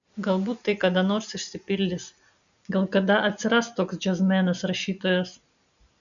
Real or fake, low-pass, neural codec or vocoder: real; 7.2 kHz; none